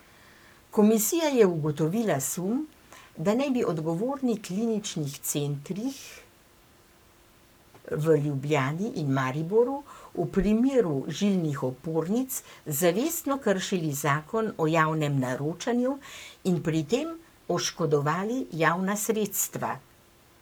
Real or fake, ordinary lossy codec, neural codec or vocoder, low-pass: fake; none; codec, 44.1 kHz, 7.8 kbps, Pupu-Codec; none